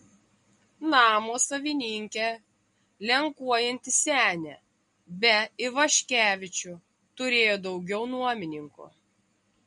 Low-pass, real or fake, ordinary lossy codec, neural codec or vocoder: 19.8 kHz; real; MP3, 48 kbps; none